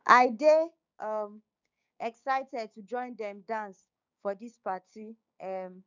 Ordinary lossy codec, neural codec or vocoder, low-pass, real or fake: none; codec, 16 kHz, 6 kbps, DAC; 7.2 kHz; fake